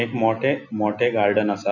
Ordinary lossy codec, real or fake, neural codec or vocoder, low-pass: none; real; none; 7.2 kHz